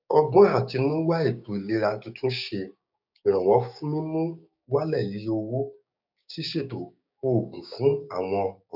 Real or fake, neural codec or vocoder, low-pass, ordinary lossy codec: fake; codec, 16 kHz, 6 kbps, DAC; 5.4 kHz; none